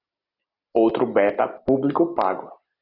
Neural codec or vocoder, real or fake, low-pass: none; real; 5.4 kHz